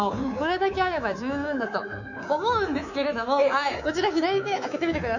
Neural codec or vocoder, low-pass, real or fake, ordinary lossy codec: codec, 24 kHz, 3.1 kbps, DualCodec; 7.2 kHz; fake; none